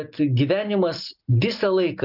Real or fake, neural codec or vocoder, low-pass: real; none; 5.4 kHz